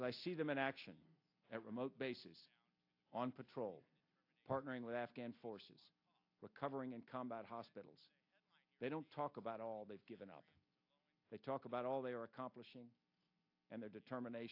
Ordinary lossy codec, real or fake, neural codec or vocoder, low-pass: AAC, 48 kbps; real; none; 5.4 kHz